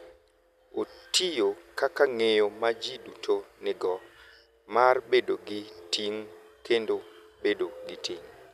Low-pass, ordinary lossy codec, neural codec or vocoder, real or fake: 14.4 kHz; none; none; real